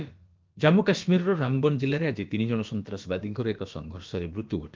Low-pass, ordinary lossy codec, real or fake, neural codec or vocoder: 7.2 kHz; Opus, 24 kbps; fake; codec, 16 kHz, about 1 kbps, DyCAST, with the encoder's durations